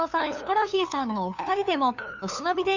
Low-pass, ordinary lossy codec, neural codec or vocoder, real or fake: 7.2 kHz; none; codec, 16 kHz, 2 kbps, FunCodec, trained on LibriTTS, 25 frames a second; fake